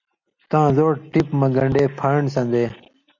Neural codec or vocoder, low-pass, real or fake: none; 7.2 kHz; real